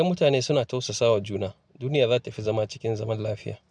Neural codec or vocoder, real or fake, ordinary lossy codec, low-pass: none; real; none; none